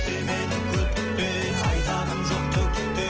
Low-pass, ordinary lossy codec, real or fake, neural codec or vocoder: 7.2 kHz; Opus, 16 kbps; real; none